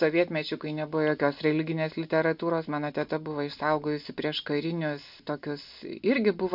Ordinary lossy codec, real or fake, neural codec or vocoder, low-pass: AAC, 48 kbps; real; none; 5.4 kHz